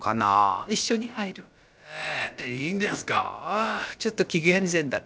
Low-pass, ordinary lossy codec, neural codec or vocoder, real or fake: none; none; codec, 16 kHz, about 1 kbps, DyCAST, with the encoder's durations; fake